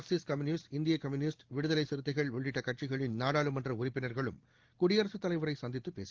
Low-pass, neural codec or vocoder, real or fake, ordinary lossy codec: 7.2 kHz; none; real; Opus, 16 kbps